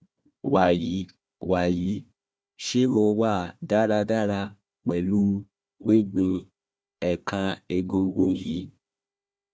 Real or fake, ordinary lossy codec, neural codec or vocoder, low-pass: fake; none; codec, 16 kHz, 1 kbps, FunCodec, trained on Chinese and English, 50 frames a second; none